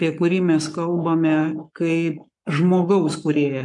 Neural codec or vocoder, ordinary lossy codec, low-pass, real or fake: codec, 44.1 kHz, 7.8 kbps, Pupu-Codec; MP3, 96 kbps; 10.8 kHz; fake